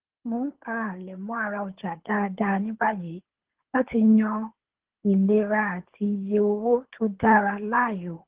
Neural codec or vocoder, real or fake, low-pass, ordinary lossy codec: codec, 24 kHz, 6 kbps, HILCodec; fake; 3.6 kHz; Opus, 16 kbps